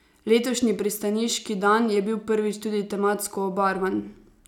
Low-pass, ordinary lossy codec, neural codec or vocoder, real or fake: 19.8 kHz; none; none; real